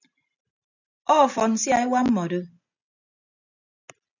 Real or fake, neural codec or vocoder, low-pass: real; none; 7.2 kHz